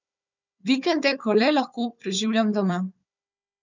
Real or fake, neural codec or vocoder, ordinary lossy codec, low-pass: fake; codec, 16 kHz, 4 kbps, FunCodec, trained on Chinese and English, 50 frames a second; none; 7.2 kHz